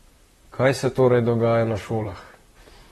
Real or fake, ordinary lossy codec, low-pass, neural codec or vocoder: fake; AAC, 32 kbps; 19.8 kHz; vocoder, 44.1 kHz, 128 mel bands, Pupu-Vocoder